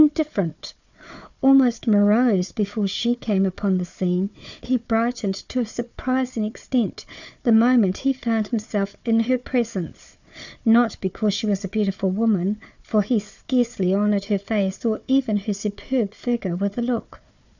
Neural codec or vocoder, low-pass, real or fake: codec, 16 kHz, 4 kbps, FunCodec, trained on Chinese and English, 50 frames a second; 7.2 kHz; fake